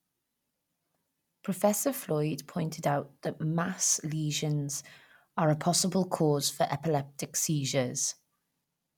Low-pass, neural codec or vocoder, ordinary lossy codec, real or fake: 19.8 kHz; none; none; real